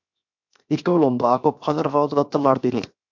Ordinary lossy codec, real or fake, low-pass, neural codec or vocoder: MP3, 64 kbps; fake; 7.2 kHz; codec, 16 kHz, 0.7 kbps, FocalCodec